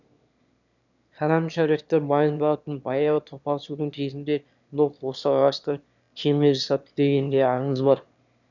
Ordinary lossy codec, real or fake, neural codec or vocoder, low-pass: none; fake; autoencoder, 22.05 kHz, a latent of 192 numbers a frame, VITS, trained on one speaker; 7.2 kHz